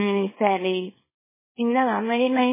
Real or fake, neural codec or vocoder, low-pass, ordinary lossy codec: fake; codec, 24 kHz, 0.9 kbps, WavTokenizer, small release; 3.6 kHz; MP3, 16 kbps